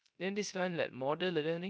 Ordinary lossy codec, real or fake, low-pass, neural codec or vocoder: none; fake; none; codec, 16 kHz, 0.3 kbps, FocalCodec